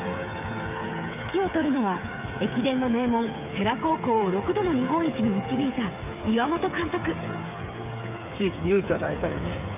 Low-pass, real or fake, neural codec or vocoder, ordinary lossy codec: 3.6 kHz; fake; codec, 16 kHz, 8 kbps, FreqCodec, smaller model; none